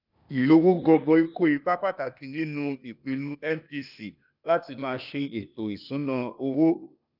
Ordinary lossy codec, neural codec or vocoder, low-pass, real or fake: none; codec, 16 kHz, 0.8 kbps, ZipCodec; 5.4 kHz; fake